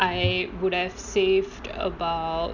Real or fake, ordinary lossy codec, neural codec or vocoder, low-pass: real; none; none; 7.2 kHz